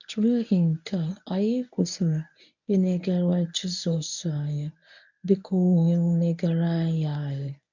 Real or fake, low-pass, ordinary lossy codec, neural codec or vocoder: fake; 7.2 kHz; none; codec, 24 kHz, 0.9 kbps, WavTokenizer, medium speech release version 1